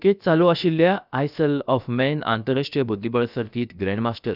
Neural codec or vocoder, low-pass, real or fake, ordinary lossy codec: codec, 16 kHz, about 1 kbps, DyCAST, with the encoder's durations; 5.4 kHz; fake; none